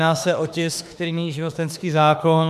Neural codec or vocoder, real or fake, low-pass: autoencoder, 48 kHz, 32 numbers a frame, DAC-VAE, trained on Japanese speech; fake; 14.4 kHz